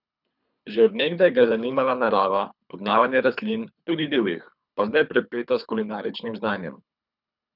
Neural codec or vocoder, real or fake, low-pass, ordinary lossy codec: codec, 24 kHz, 3 kbps, HILCodec; fake; 5.4 kHz; none